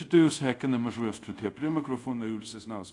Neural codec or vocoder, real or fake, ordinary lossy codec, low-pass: codec, 24 kHz, 0.5 kbps, DualCodec; fake; AAC, 48 kbps; 10.8 kHz